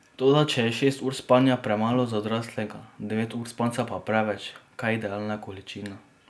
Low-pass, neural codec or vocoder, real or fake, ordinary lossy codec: none; none; real; none